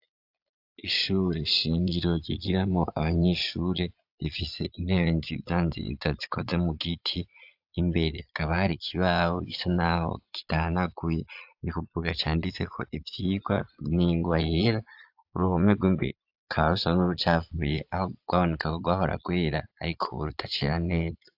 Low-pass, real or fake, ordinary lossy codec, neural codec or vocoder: 5.4 kHz; fake; AAC, 48 kbps; vocoder, 22.05 kHz, 80 mel bands, Vocos